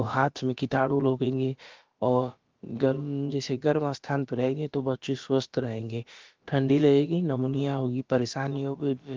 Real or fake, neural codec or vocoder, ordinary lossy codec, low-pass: fake; codec, 16 kHz, about 1 kbps, DyCAST, with the encoder's durations; Opus, 32 kbps; 7.2 kHz